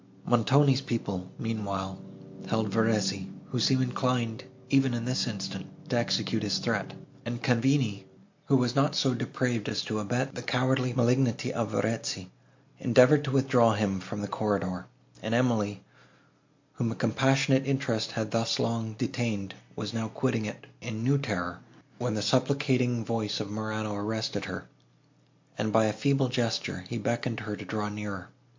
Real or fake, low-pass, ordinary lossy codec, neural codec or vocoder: real; 7.2 kHz; MP3, 48 kbps; none